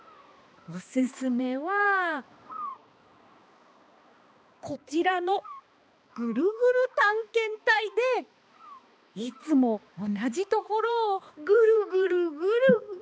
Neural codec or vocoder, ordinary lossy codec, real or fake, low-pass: codec, 16 kHz, 2 kbps, X-Codec, HuBERT features, trained on balanced general audio; none; fake; none